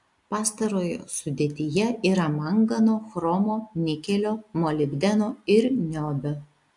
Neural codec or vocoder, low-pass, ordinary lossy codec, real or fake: none; 10.8 kHz; AAC, 64 kbps; real